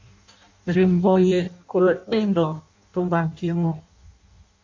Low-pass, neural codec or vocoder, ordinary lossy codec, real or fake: 7.2 kHz; codec, 16 kHz in and 24 kHz out, 0.6 kbps, FireRedTTS-2 codec; MP3, 48 kbps; fake